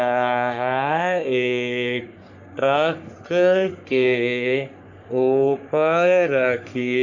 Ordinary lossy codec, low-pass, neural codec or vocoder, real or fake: none; 7.2 kHz; codec, 44.1 kHz, 3.4 kbps, Pupu-Codec; fake